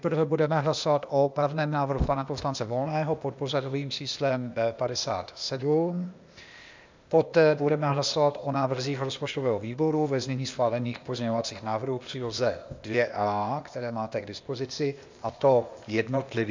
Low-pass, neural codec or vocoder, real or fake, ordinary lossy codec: 7.2 kHz; codec, 16 kHz, 0.8 kbps, ZipCodec; fake; MP3, 64 kbps